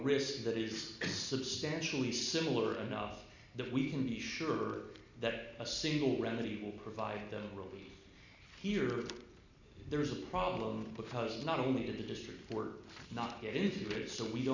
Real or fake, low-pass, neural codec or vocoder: real; 7.2 kHz; none